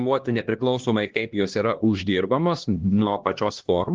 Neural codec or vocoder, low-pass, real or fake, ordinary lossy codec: codec, 16 kHz, 1 kbps, X-Codec, HuBERT features, trained on LibriSpeech; 7.2 kHz; fake; Opus, 16 kbps